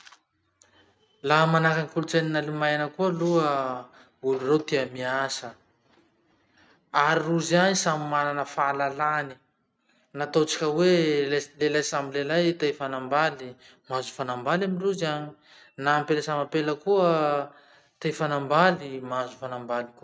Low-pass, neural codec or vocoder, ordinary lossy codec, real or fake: none; none; none; real